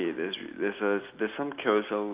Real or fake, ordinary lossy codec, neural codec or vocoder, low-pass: real; Opus, 32 kbps; none; 3.6 kHz